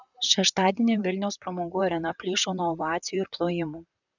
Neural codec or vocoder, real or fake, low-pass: vocoder, 44.1 kHz, 128 mel bands, Pupu-Vocoder; fake; 7.2 kHz